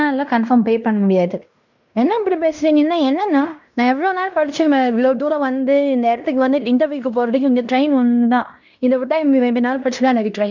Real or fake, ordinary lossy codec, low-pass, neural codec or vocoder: fake; none; 7.2 kHz; codec, 16 kHz in and 24 kHz out, 0.9 kbps, LongCat-Audio-Codec, fine tuned four codebook decoder